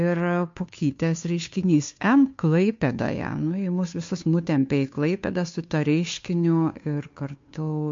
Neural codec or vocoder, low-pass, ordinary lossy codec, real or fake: codec, 16 kHz, 2 kbps, FunCodec, trained on Chinese and English, 25 frames a second; 7.2 kHz; MP3, 48 kbps; fake